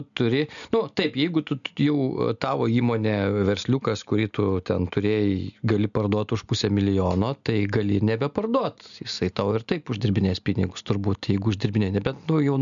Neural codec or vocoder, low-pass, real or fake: none; 7.2 kHz; real